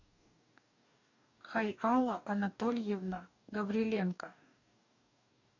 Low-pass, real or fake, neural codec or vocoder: 7.2 kHz; fake; codec, 44.1 kHz, 2.6 kbps, DAC